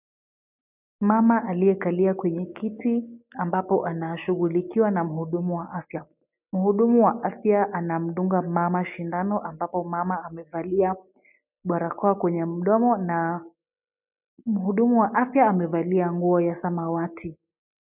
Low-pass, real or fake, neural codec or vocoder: 3.6 kHz; real; none